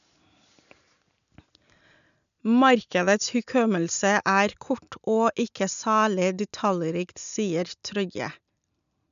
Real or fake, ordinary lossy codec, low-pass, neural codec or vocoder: real; none; 7.2 kHz; none